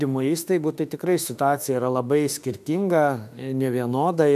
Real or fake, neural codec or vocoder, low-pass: fake; autoencoder, 48 kHz, 32 numbers a frame, DAC-VAE, trained on Japanese speech; 14.4 kHz